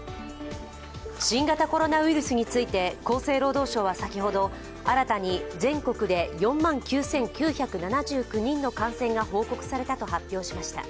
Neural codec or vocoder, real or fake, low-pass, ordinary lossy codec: none; real; none; none